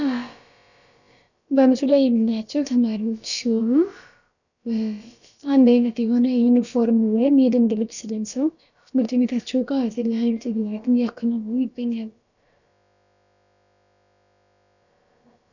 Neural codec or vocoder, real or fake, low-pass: codec, 16 kHz, about 1 kbps, DyCAST, with the encoder's durations; fake; 7.2 kHz